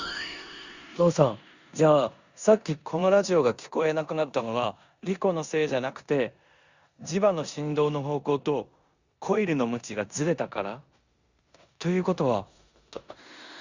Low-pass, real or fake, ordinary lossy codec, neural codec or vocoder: 7.2 kHz; fake; Opus, 64 kbps; codec, 16 kHz in and 24 kHz out, 0.9 kbps, LongCat-Audio-Codec, four codebook decoder